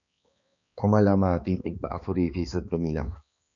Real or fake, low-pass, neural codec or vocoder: fake; 7.2 kHz; codec, 16 kHz, 2 kbps, X-Codec, HuBERT features, trained on balanced general audio